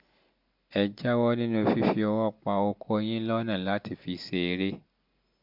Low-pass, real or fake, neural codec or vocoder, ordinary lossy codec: 5.4 kHz; real; none; MP3, 48 kbps